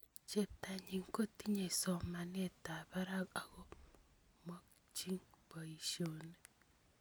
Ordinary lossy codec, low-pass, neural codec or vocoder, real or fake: none; none; none; real